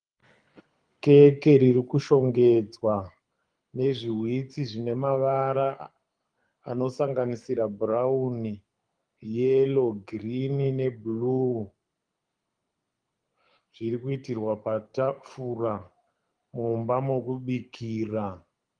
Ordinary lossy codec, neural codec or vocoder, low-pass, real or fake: Opus, 32 kbps; codec, 24 kHz, 6 kbps, HILCodec; 9.9 kHz; fake